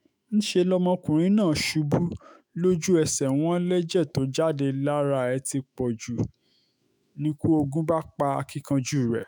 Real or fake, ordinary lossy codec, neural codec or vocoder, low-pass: fake; none; autoencoder, 48 kHz, 128 numbers a frame, DAC-VAE, trained on Japanese speech; none